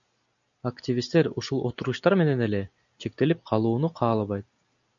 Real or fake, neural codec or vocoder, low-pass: real; none; 7.2 kHz